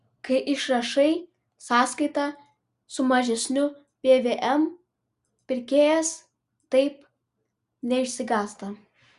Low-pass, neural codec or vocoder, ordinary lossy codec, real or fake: 10.8 kHz; none; Opus, 64 kbps; real